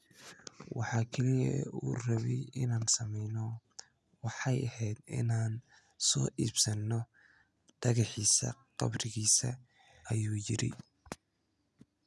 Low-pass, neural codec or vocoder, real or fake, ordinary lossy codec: none; none; real; none